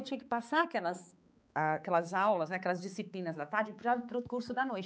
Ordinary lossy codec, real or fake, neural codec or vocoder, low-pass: none; fake; codec, 16 kHz, 4 kbps, X-Codec, HuBERT features, trained on balanced general audio; none